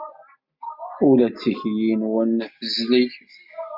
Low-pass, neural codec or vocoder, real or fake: 5.4 kHz; none; real